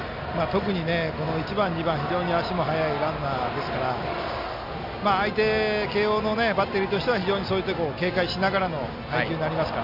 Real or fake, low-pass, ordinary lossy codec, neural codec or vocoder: real; 5.4 kHz; none; none